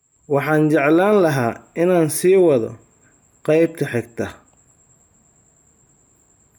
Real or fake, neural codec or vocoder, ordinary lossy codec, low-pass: real; none; none; none